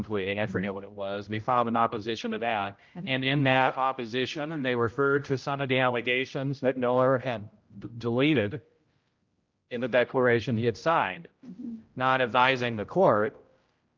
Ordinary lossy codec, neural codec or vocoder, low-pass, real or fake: Opus, 24 kbps; codec, 16 kHz, 0.5 kbps, X-Codec, HuBERT features, trained on general audio; 7.2 kHz; fake